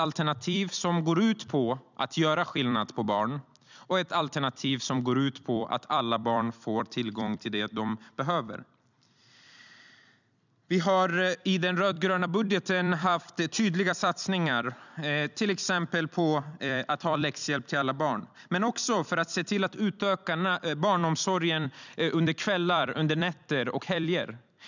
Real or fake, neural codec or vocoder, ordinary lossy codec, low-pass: fake; vocoder, 44.1 kHz, 128 mel bands every 256 samples, BigVGAN v2; none; 7.2 kHz